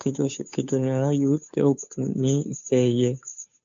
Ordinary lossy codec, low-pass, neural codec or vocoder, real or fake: MP3, 64 kbps; 7.2 kHz; codec, 16 kHz, 2 kbps, FunCodec, trained on Chinese and English, 25 frames a second; fake